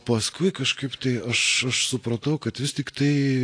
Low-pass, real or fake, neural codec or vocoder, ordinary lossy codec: 9.9 kHz; real; none; AAC, 48 kbps